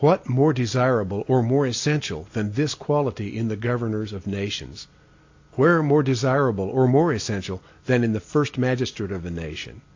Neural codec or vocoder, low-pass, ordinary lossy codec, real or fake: none; 7.2 kHz; AAC, 48 kbps; real